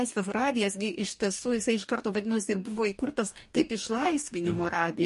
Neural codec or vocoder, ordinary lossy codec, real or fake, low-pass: codec, 44.1 kHz, 2.6 kbps, DAC; MP3, 48 kbps; fake; 14.4 kHz